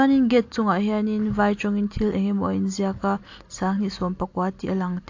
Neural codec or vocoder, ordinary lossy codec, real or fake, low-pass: none; AAC, 48 kbps; real; 7.2 kHz